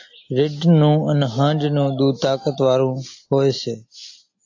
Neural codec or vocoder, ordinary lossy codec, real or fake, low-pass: none; AAC, 48 kbps; real; 7.2 kHz